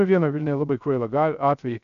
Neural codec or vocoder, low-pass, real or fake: codec, 16 kHz, 0.3 kbps, FocalCodec; 7.2 kHz; fake